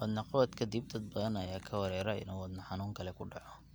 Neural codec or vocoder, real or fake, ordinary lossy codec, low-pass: none; real; none; none